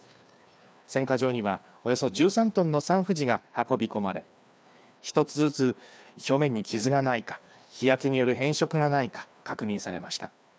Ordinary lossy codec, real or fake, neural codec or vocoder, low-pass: none; fake; codec, 16 kHz, 2 kbps, FreqCodec, larger model; none